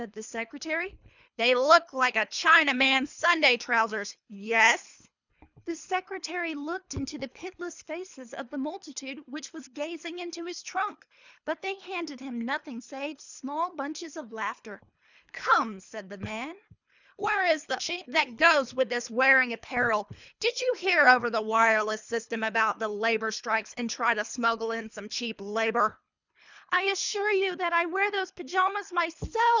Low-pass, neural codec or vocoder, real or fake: 7.2 kHz; codec, 24 kHz, 3 kbps, HILCodec; fake